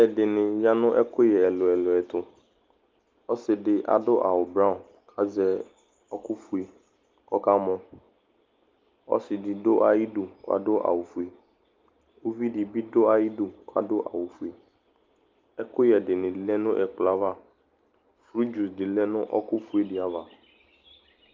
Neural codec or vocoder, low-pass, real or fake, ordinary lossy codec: none; 7.2 kHz; real; Opus, 24 kbps